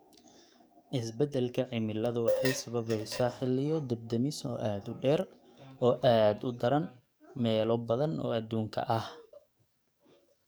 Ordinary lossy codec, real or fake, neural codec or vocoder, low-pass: none; fake; codec, 44.1 kHz, 7.8 kbps, DAC; none